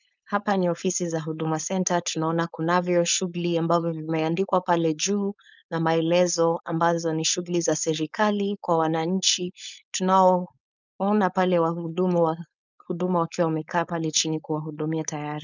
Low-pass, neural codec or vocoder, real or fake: 7.2 kHz; codec, 16 kHz, 4.8 kbps, FACodec; fake